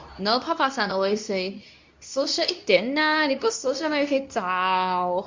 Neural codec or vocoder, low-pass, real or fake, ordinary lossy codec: codec, 24 kHz, 0.9 kbps, WavTokenizer, medium speech release version 2; 7.2 kHz; fake; MP3, 64 kbps